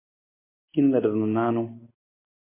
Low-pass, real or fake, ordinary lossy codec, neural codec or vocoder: 3.6 kHz; real; MP3, 24 kbps; none